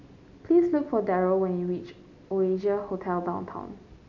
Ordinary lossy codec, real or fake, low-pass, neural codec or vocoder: none; real; 7.2 kHz; none